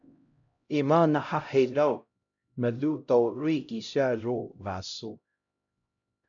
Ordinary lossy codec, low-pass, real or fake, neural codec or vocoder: AAC, 64 kbps; 7.2 kHz; fake; codec, 16 kHz, 0.5 kbps, X-Codec, HuBERT features, trained on LibriSpeech